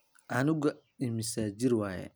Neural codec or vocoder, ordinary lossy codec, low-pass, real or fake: none; none; none; real